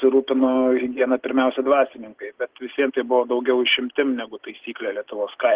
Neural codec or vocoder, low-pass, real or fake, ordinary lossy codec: none; 3.6 kHz; real; Opus, 16 kbps